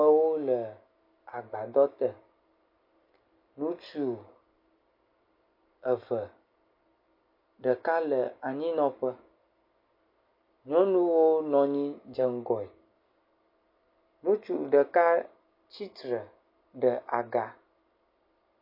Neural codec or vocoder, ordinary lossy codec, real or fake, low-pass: none; MP3, 32 kbps; real; 5.4 kHz